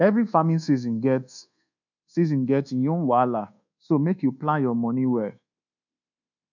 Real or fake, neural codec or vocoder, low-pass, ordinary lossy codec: fake; codec, 24 kHz, 1.2 kbps, DualCodec; 7.2 kHz; none